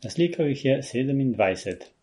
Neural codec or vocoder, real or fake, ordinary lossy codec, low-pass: none; real; MP3, 48 kbps; 19.8 kHz